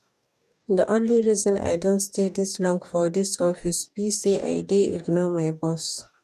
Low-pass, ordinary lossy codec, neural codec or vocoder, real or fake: 14.4 kHz; none; codec, 44.1 kHz, 2.6 kbps, DAC; fake